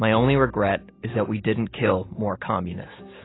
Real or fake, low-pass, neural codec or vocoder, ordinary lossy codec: real; 7.2 kHz; none; AAC, 16 kbps